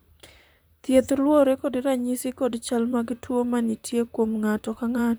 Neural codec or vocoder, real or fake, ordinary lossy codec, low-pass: vocoder, 44.1 kHz, 128 mel bands, Pupu-Vocoder; fake; none; none